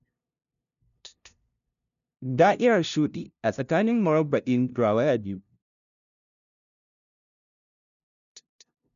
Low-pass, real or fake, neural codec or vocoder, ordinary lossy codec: 7.2 kHz; fake; codec, 16 kHz, 0.5 kbps, FunCodec, trained on LibriTTS, 25 frames a second; none